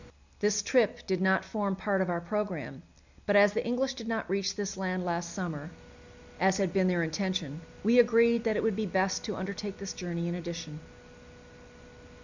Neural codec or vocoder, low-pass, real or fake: none; 7.2 kHz; real